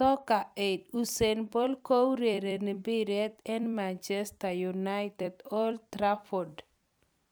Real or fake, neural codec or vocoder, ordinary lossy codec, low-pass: fake; vocoder, 44.1 kHz, 128 mel bands every 256 samples, BigVGAN v2; none; none